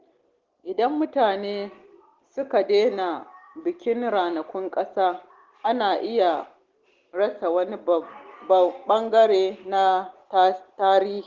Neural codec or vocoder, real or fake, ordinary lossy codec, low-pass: none; real; Opus, 16 kbps; 7.2 kHz